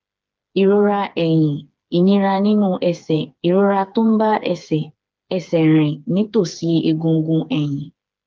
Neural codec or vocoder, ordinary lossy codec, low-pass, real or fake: codec, 16 kHz, 4 kbps, FreqCodec, smaller model; Opus, 32 kbps; 7.2 kHz; fake